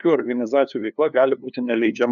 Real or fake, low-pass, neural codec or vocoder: fake; 7.2 kHz; codec, 16 kHz, 2 kbps, FunCodec, trained on LibriTTS, 25 frames a second